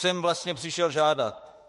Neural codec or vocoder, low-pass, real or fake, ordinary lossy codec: autoencoder, 48 kHz, 32 numbers a frame, DAC-VAE, trained on Japanese speech; 14.4 kHz; fake; MP3, 48 kbps